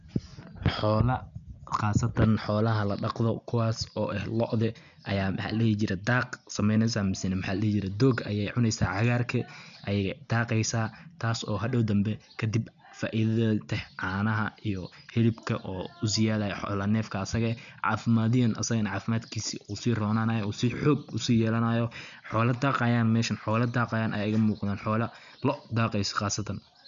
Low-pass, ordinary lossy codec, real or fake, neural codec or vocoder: 7.2 kHz; none; real; none